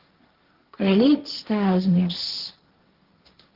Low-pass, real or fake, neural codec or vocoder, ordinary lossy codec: 5.4 kHz; fake; codec, 16 kHz, 1.1 kbps, Voila-Tokenizer; Opus, 16 kbps